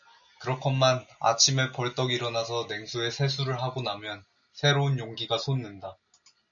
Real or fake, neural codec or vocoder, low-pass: real; none; 7.2 kHz